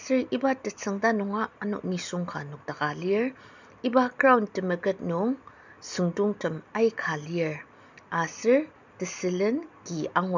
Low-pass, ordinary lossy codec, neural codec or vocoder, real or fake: 7.2 kHz; none; none; real